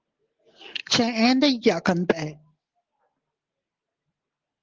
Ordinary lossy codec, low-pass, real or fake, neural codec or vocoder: Opus, 16 kbps; 7.2 kHz; real; none